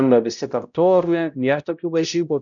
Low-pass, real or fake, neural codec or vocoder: 7.2 kHz; fake; codec, 16 kHz, 0.5 kbps, X-Codec, HuBERT features, trained on balanced general audio